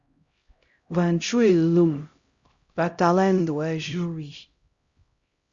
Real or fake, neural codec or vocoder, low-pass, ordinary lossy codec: fake; codec, 16 kHz, 0.5 kbps, X-Codec, HuBERT features, trained on LibriSpeech; 7.2 kHz; Opus, 64 kbps